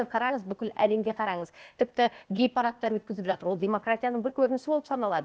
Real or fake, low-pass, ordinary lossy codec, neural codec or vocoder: fake; none; none; codec, 16 kHz, 0.8 kbps, ZipCodec